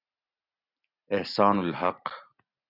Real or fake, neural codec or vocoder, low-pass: real; none; 5.4 kHz